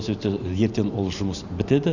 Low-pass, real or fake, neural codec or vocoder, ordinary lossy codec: 7.2 kHz; fake; vocoder, 44.1 kHz, 128 mel bands every 512 samples, BigVGAN v2; none